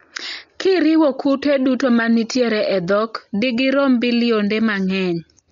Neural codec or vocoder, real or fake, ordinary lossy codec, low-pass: none; real; MP3, 64 kbps; 7.2 kHz